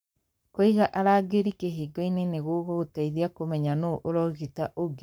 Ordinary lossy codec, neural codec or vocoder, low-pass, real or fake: none; codec, 44.1 kHz, 7.8 kbps, Pupu-Codec; none; fake